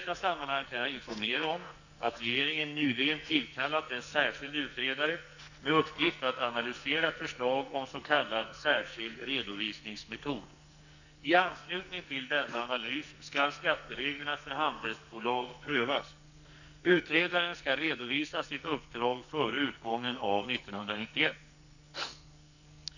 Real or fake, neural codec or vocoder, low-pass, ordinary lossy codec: fake; codec, 44.1 kHz, 2.6 kbps, SNAC; 7.2 kHz; none